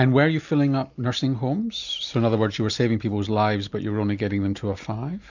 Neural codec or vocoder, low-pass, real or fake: none; 7.2 kHz; real